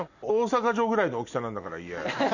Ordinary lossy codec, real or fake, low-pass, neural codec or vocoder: none; real; 7.2 kHz; none